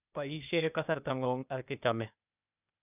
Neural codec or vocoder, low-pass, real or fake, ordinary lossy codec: codec, 16 kHz, 0.8 kbps, ZipCodec; 3.6 kHz; fake; none